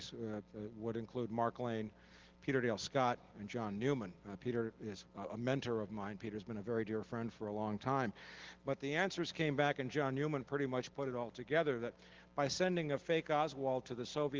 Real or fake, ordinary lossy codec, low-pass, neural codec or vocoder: real; Opus, 16 kbps; 7.2 kHz; none